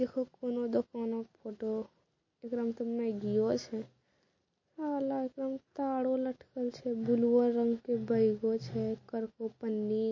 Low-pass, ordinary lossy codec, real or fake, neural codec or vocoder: 7.2 kHz; MP3, 32 kbps; real; none